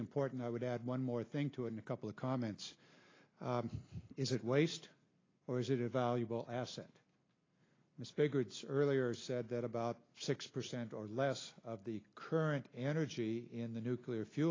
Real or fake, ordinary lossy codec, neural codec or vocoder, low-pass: real; AAC, 32 kbps; none; 7.2 kHz